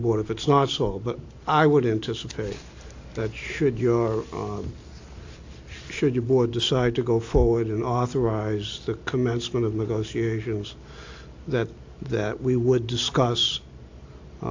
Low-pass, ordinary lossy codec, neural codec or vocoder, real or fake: 7.2 kHz; AAC, 48 kbps; none; real